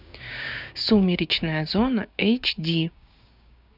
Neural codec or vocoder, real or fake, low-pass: codec, 16 kHz, 6 kbps, DAC; fake; 5.4 kHz